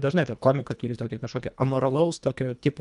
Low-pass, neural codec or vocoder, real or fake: 10.8 kHz; codec, 24 kHz, 1.5 kbps, HILCodec; fake